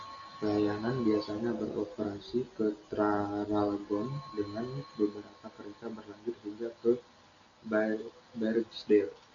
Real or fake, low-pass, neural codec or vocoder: real; 7.2 kHz; none